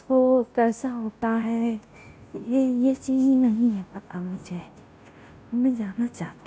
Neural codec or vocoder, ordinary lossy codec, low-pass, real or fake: codec, 16 kHz, 0.5 kbps, FunCodec, trained on Chinese and English, 25 frames a second; none; none; fake